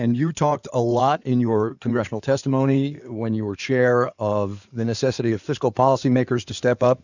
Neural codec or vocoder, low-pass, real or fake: codec, 16 kHz in and 24 kHz out, 2.2 kbps, FireRedTTS-2 codec; 7.2 kHz; fake